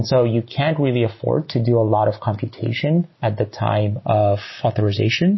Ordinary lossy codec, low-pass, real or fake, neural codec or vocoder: MP3, 24 kbps; 7.2 kHz; real; none